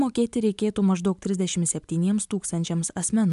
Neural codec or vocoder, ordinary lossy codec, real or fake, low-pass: none; AAC, 96 kbps; real; 10.8 kHz